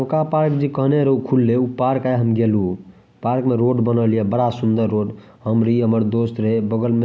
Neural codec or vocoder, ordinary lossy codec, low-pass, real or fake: none; none; none; real